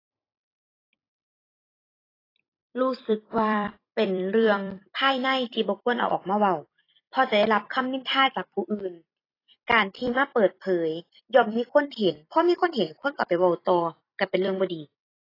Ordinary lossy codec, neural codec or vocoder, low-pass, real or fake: AAC, 24 kbps; vocoder, 44.1 kHz, 128 mel bands every 256 samples, BigVGAN v2; 5.4 kHz; fake